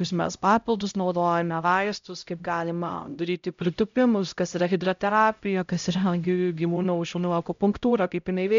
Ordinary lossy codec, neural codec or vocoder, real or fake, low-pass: MP3, 64 kbps; codec, 16 kHz, 0.5 kbps, X-Codec, HuBERT features, trained on LibriSpeech; fake; 7.2 kHz